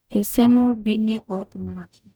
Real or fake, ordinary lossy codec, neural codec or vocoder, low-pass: fake; none; codec, 44.1 kHz, 0.9 kbps, DAC; none